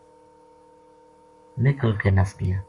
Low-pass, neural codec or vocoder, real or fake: 10.8 kHz; codec, 44.1 kHz, 7.8 kbps, Pupu-Codec; fake